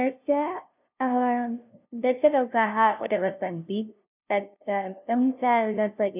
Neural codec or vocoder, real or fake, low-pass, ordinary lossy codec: codec, 16 kHz, 0.5 kbps, FunCodec, trained on LibriTTS, 25 frames a second; fake; 3.6 kHz; none